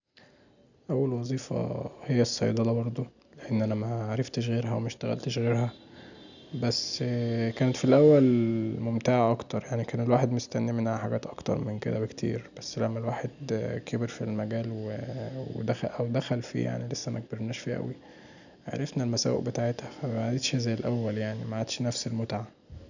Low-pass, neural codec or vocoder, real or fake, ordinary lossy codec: 7.2 kHz; none; real; none